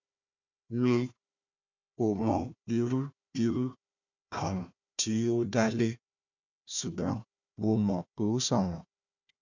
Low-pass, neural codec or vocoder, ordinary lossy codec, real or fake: 7.2 kHz; codec, 16 kHz, 1 kbps, FunCodec, trained on Chinese and English, 50 frames a second; none; fake